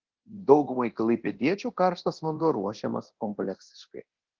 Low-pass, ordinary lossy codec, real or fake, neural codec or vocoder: 7.2 kHz; Opus, 16 kbps; fake; codec, 24 kHz, 0.9 kbps, DualCodec